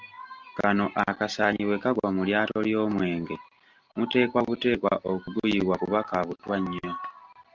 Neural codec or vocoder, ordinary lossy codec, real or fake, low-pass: none; Opus, 32 kbps; real; 7.2 kHz